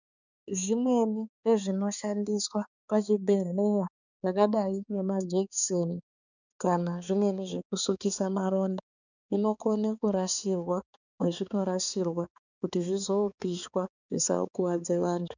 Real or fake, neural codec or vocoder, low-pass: fake; codec, 16 kHz, 4 kbps, X-Codec, HuBERT features, trained on balanced general audio; 7.2 kHz